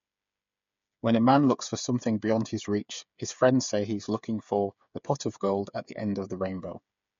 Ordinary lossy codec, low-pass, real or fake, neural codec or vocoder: MP3, 48 kbps; 7.2 kHz; fake; codec, 16 kHz, 8 kbps, FreqCodec, smaller model